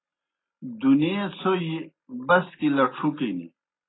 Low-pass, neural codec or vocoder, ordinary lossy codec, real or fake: 7.2 kHz; none; AAC, 16 kbps; real